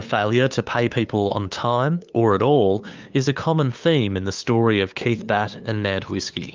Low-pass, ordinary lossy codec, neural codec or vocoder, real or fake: 7.2 kHz; Opus, 24 kbps; codec, 16 kHz, 2 kbps, FunCodec, trained on LibriTTS, 25 frames a second; fake